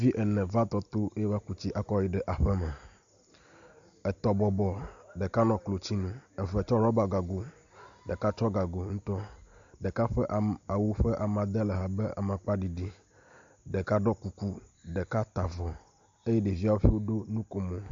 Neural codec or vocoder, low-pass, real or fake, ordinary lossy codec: none; 7.2 kHz; real; AAC, 64 kbps